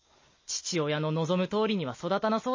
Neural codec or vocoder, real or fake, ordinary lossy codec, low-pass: none; real; MP3, 32 kbps; 7.2 kHz